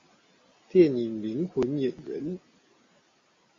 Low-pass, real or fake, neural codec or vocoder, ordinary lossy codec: 7.2 kHz; real; none; MP3, 32 kbps